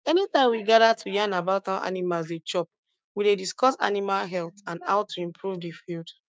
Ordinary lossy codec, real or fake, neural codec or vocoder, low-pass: none; fake; codec, 16 kHz, 6 kbps, DAC; none